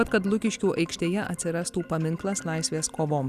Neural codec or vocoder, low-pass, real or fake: none; 14.4 kHz; real